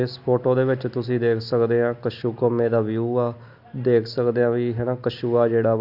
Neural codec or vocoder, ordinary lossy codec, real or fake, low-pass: none; AAC, 48 kbps; real; 5.4 kHz